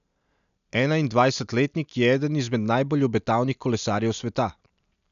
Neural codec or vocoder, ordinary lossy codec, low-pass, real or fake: none; none; 7.2 kHz; real